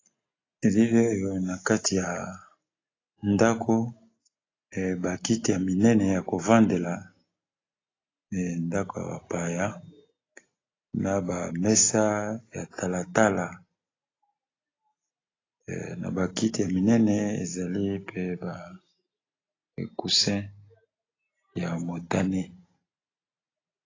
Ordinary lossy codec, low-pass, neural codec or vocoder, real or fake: AAC, 32 kbps; 7.2 kHz; none; real